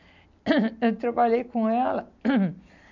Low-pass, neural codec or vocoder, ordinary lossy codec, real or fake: 7.2 kHz; none; none; real